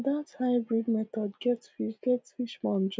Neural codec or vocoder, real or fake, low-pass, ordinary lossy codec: none; real; none; none